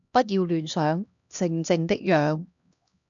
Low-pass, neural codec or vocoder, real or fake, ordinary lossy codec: 7.2 kHz; codec, 16 kHz, 1 kbps, X-Codec, HuBERT features, trained on LibriSpeech; fake; AAC, 64 kbps